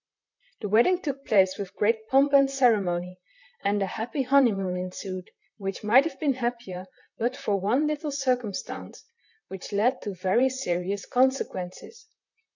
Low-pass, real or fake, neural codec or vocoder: 7.2 kHz; fake; vocoder, 44.1 kHz, 128 mel bands, Pupu-Vocoder